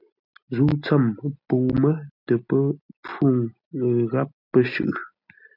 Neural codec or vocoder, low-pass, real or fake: none; 5.4 kHz; real